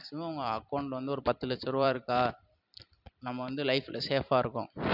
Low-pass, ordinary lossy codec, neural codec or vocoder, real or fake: 5.4 kHz; none; vocoder, 44.1 kHz, 128 mel bands every 256 samples, BigVGAN v2; fake